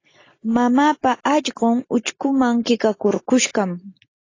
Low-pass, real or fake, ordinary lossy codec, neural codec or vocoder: 7.2 kHz; real; AAC, 32 kbps; none